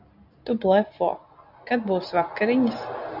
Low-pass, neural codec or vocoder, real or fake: 5.4 kHz; none; real